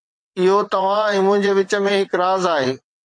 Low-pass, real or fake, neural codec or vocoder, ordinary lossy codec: 9.9 kHz; fake; vocoder, 22.05 kHz, 80 mel bands, WaveNeXt; MP3, 48 kbps